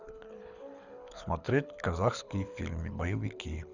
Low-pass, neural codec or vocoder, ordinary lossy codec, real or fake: 7.2 kHz; codec, 24 kHz, 6 kbps, HILCodec; none; fake